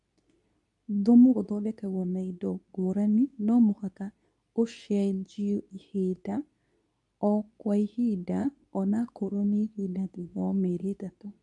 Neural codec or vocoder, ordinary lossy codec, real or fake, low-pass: codec, 24 kHz, 0.9 kbps, WavTokenizer, medium speech release version 2; none; fake; 10.8 kHz